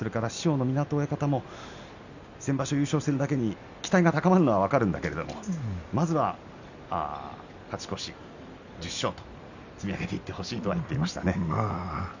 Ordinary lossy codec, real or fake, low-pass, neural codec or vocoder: none; real; 7.2 kHz; none